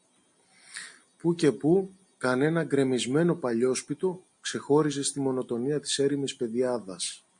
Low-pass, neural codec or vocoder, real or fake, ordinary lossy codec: 9.9 kHz; none; real; MP3, 48 kbps